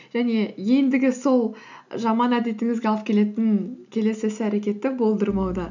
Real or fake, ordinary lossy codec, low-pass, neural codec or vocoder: real; none; 7.2 kHz; none